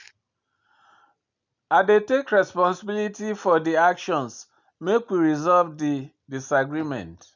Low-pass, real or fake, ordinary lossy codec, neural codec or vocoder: 7.2 kHz; real; none; none